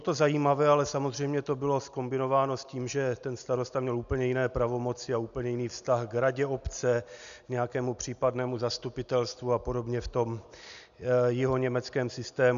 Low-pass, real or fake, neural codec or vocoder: 7.2 kHz; real; none